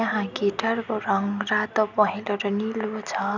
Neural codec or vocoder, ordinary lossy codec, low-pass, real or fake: none; none; 7.2 kHz; real